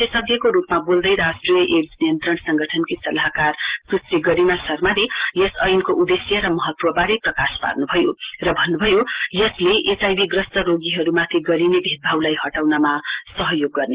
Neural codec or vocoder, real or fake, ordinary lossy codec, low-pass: none; real; Opus, 16 kbps; 3.6 kHz